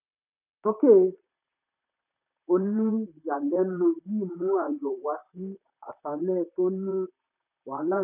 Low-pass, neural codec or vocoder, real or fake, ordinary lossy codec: 3.6 kHz; vocoder, 44.1 kHz, 128 mel bands, Pupu-Vocoder; fake; none